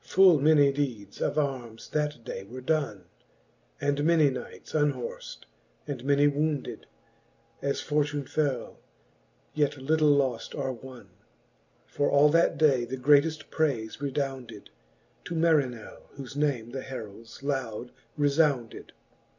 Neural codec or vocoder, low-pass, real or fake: none; 7.2 kHz; real